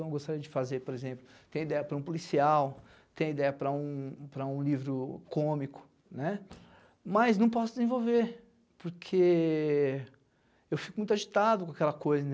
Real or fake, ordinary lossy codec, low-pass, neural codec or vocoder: real; none; none; none